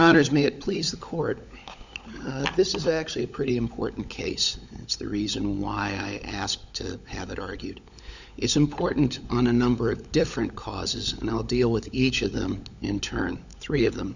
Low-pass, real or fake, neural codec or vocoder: 7.2 kHz; fake; codec, 16 kHz, 16 kbps, FunCodec, trained on LibriTTS, 50 frames a second